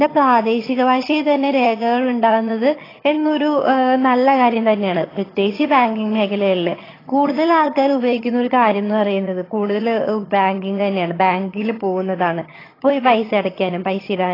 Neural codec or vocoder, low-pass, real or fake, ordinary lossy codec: vocoder, 22.05 kHz, 80 mel bands, HiFi-GAN; 5.4 kHz; fake; AAC, 24 kbps